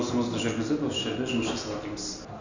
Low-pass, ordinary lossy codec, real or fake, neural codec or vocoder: 7.2 kHz; none; real; none